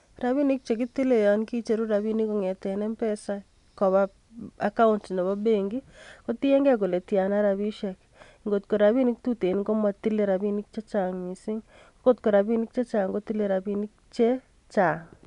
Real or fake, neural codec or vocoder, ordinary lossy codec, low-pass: real; none; none; 10.8 kHz